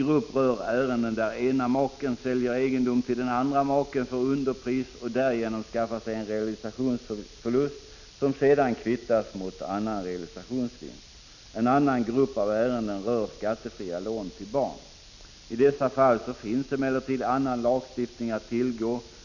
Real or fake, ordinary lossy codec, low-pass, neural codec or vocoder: real; none; 7.2 kHz; none